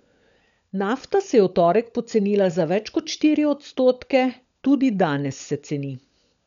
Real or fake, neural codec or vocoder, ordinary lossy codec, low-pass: fake; codec, 16 kHz, 16 kbps, FunCodec, trained on LibriTTS, 50 frames a second; none; 7.2 kHz